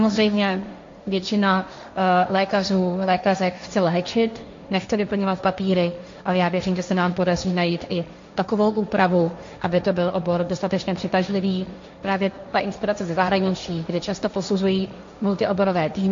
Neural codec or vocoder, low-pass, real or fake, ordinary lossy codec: codec, 16 kHz, 1.1 kbps, Voila-Tokenizer; 7.2 kHz; fake; MP3, 48 kbps